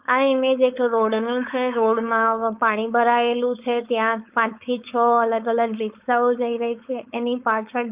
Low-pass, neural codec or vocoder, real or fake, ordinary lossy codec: 3.6 kHz; codec, 16 kHz, 4.8 kbps, FACodec; fake; Opus, 24 kbps